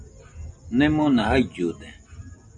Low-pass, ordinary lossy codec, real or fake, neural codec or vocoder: 9.9 kHz; MP3, 64 kbps; real; none